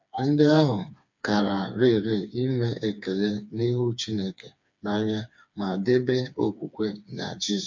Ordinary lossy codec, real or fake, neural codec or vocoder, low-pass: MP3, 64 kbps; fake; codec, 16 kHz, 4 kbps, FreqCodec, smaller model; 7.2 kHz